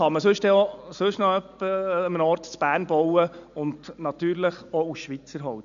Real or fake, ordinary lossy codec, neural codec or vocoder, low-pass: real; AAC, 96 kbps; none; 7.2 kHz